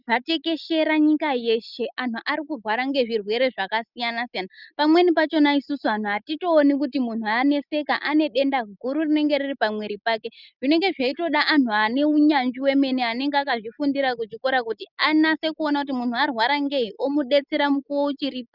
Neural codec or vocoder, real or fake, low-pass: none; real; 5.4 kHz